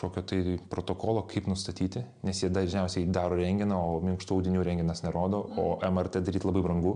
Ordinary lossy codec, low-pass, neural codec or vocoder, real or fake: MP3, 96 kbps; 9.9 kHz; none; real